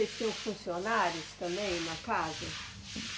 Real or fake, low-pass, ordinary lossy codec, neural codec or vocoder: real; none; none; none